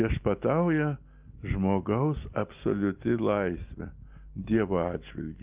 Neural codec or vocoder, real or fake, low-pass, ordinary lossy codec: none; real; 3.6 kHz; Opus, 32 kbps